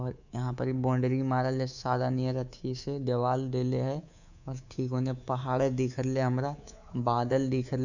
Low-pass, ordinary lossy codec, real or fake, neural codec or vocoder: 7.2 kHz; none; fake; codec, 24 kHz, 3.1 kbps, DualCodec